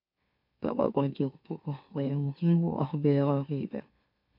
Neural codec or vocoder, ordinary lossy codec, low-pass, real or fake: autoencoder, 44.1 kHz, a latent of 192 numbers a frame, MeloTTS; MP3, 48 kbps; 5.4 kHz; fake